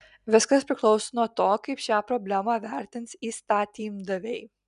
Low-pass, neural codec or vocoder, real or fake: 10.8 kHz; none; real